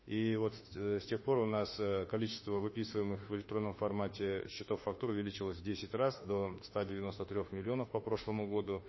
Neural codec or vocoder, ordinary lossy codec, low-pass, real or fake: autoencoder, 48 kHz, 32 numbers a frame, DAC-VAE, trained on Japanese speech; MP3, 24 kbps; 7.2 kHz; fake